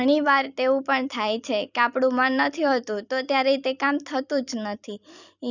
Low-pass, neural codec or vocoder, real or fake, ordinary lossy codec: 7.2 kHz; vocoder, 44.1 kHz, 128 mel bands every 256 samples, BigVGAN v2; fake; none